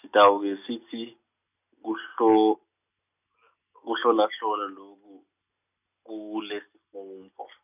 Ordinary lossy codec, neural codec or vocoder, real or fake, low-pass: none; none; real; 3.6 kHz